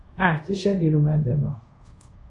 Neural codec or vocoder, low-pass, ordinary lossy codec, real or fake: codec, 24 kHz, 0.5 kbps, DualCodec; 10.8 kHz; MP3, 64 kbps; fake